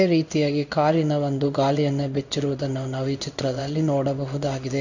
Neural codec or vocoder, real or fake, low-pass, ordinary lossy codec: codec, 16 kHz in and 24 kHz out, 1 kbps, XY-Tokenizer; fake; 7.2 kHz; none